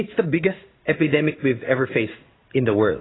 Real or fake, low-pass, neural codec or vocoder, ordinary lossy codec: fake; 7.2 kHz; codec, 16 kHz in and 24 kHz out, 1 kbps, XY-Tokenizer; AAC, 16 kbps